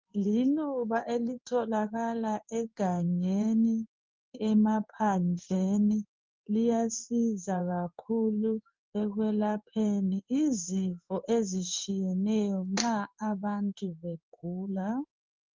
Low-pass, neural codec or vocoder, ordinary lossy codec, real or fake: 7.2 kHz; codec, 16 kHz in and 24 kHz out, 1 kbps, XY-Tokenizer; Opus, 24 kbps; fake